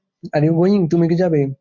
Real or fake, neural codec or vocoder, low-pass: real; none; 7.2 kHz